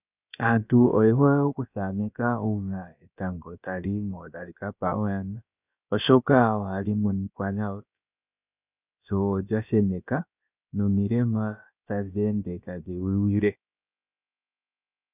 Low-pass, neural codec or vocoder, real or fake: 3.6 kHz; codec, 16 kHz, about 1 kbps, DyCAST, with the encoder's durations; fake